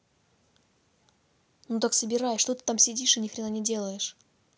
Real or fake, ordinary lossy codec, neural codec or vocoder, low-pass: real; none; none; none